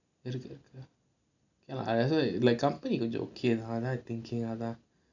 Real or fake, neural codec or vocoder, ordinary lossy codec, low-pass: real; none; none; 7.2 kHz